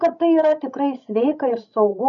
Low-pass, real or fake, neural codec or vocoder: 7.2 kHz; fake; codec, 16 kHz, 16 kbps, FreqCodec, larger model